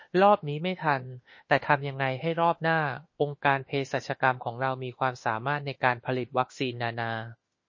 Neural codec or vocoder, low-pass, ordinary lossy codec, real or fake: autoencoder, 48 kHz, 32 numbers a frame, DAC-VAE, trained on Japanese speech; 7.2 kHz; MP3, 32 kbps; fake